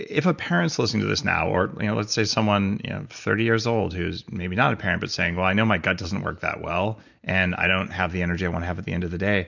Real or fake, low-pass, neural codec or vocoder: real; 7.2 kHz; none